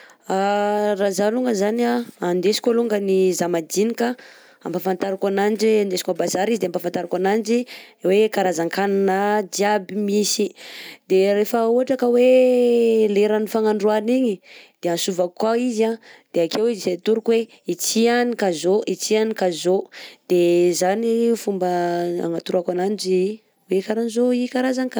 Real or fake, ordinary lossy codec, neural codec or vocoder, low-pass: real; none; none; none